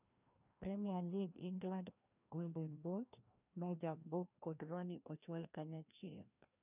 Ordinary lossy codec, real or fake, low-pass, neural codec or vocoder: none; fake; 3.6 kHz; codec, 16 kHz, 1 kbps, FreqCodec, larger model